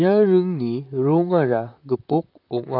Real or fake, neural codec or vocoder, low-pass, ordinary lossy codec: fake; vocoder, 44.1 kHz, 128 mel bands, Pupu-Vocoder; 5.4 kHz; none